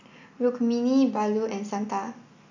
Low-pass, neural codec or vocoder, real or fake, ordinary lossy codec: 7.2 kHz; none; real; AAC, 48 kbps